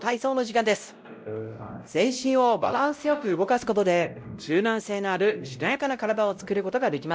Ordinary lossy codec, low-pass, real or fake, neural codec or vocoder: none; none; fake; codec, 16 kHz, 0.5 kbps, X-Codec, WavLM features, trained on Multilingual LibriSpeech